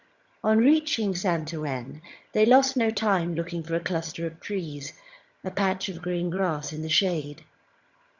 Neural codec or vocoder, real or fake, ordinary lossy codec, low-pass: vocoder, 22.05 kHz, 80 mel bands, HiFi-GAN; fake; Opus, 64 kbps; 7.2 kHz